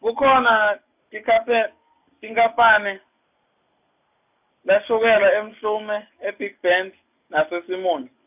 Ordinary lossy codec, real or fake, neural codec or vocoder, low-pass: none; real; none; 3.6 kHz